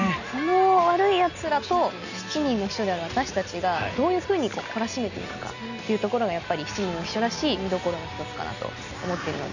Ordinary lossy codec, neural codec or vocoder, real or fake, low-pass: none; none; real; 7.2 kHz